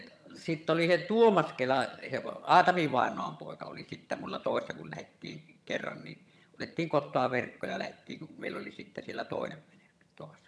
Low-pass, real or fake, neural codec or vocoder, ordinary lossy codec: none; fake; vocoder, 22.05 kHz, 80 mel bands, HiFi-GAN; none